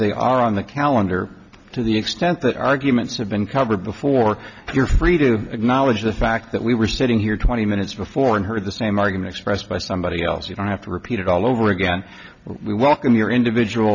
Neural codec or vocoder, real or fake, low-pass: none; real; 7.2 kHz